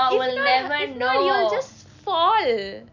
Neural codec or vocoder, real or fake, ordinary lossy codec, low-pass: none; real; none; 7.2 kHz